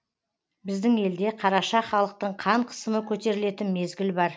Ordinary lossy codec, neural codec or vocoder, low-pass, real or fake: none; none; none; real